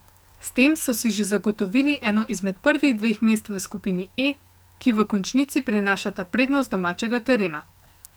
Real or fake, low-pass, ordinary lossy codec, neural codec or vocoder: fake; none; none; codec, 44.1 kHz, 2.6 kbps, SNAC